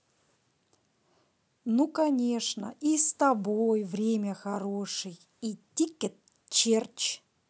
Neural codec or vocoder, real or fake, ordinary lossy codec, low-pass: none; real; none; none